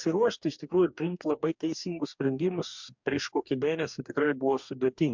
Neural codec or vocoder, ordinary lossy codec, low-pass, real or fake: codec, 44.1 kHz, 2.6 kbps, DAC; MP3, 64 kbps; 7.2 kHz; fake